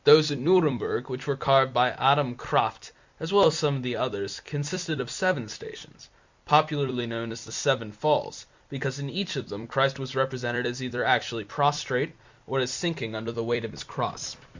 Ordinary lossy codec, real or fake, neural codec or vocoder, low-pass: Opus, 64 kbps; fake; vocoder, 44.1 kHz, 128 mel bands every 256 samples, BigVGAN v2; 7.2 kHz